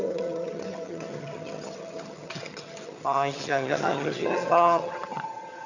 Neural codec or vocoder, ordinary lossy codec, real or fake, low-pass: vocoder, 22.05 kHz, 80 mel bands, HiFi-GAN; none; fake; 7.2 kHz